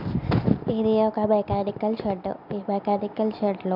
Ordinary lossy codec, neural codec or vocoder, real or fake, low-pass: none; none; real; 5.4 kHz